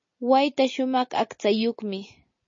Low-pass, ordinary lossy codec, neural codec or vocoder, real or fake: 7.2 kHz; MP3, 32 kbps; none; real